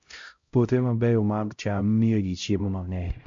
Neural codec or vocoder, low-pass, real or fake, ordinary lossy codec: codec, 16 kHz, 0.5 kbps, X-Codec, HuBERT features, trained on LibriSpeech; 7.2 kHz; fake; MP3, 48 kbps